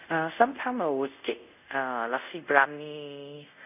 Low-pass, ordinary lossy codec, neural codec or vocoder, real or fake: 3.6 kHz; none; codec, 24 kHz, 0.5 kbps, DualCodec; fake